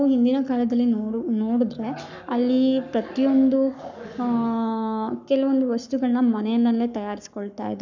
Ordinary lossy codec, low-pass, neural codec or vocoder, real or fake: none; 7.2 kHz; codec, 44.1 kHz, 7.8 kbps, Pupu-Codec; fake